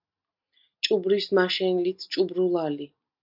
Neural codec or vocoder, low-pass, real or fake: none; 5.4 kHz; real